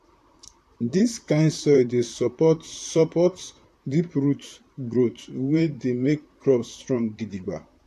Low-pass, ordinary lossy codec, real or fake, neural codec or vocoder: 14.4 kHz; AAC, 64 kbps; fake; vocoder, 44.1 kHz, 128 mel bands, Pupu-Vocoder